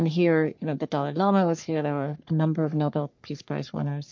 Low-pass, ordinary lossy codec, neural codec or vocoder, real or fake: 7.2 kHz; MP3, 48 kbps; codec, 44.1 kHz, 3.4 kbps, Pupu-Codec; fake